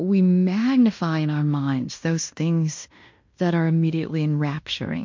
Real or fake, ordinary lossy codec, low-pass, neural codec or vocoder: fake; MP3, 48 kbps; 7.2 kHz; codec, 16 kHz in and 24 kHz out, 0.9 kbps, LongCat-Audio-Codec, fine tuned four codebook decoder